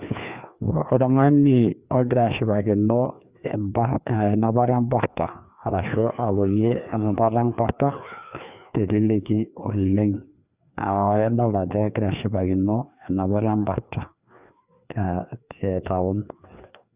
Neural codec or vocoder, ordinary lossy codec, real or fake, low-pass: codec, 16 kHz, 2 kbps, FreqCodec, larger model; Opus, 64 kbps; fake; 3.6 kHz